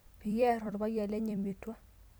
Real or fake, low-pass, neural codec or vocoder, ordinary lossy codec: fake; none; vocoder, 44.1 kHz, 128 mel bands every 256 samples, BigVGAN v2; none